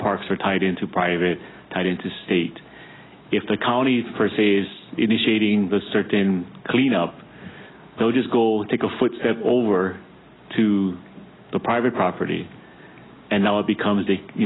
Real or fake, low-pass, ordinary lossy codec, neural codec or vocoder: real; 7.2 kHz; AAC, 16 kbps; none